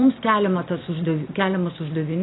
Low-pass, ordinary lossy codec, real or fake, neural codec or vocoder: 7.2 kHz; AAC, 16 kbps; real; none